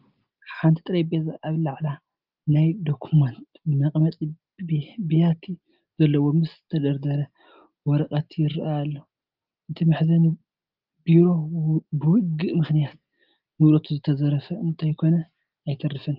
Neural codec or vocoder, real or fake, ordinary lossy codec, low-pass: none; real; Opus, 32 kbps; 5.4 kHz